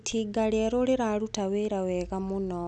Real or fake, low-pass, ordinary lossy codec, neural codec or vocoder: real; 10.8 kHz; none; none